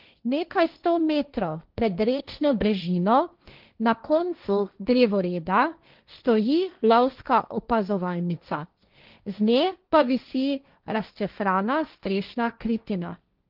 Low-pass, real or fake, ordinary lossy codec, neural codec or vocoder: 5.4 kHz; fake; Opus, 32 kbps; codec, 16 kHz, 1.1 kbps, Voila-Tokenizer